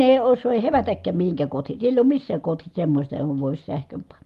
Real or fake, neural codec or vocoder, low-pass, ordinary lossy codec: real; none; 14.4 kHz; Opus, 24 kbps